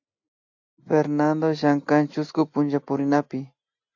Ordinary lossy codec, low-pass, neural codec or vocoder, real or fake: AAC, 48 kbps; 7.2 kHz; none; real